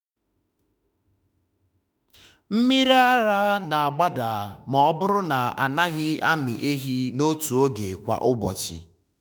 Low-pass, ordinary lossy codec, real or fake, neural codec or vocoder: none; none; fake; autoencoder, 48 kHz, 32 numbers a frame, DAC-VAE, trained on Japanese speech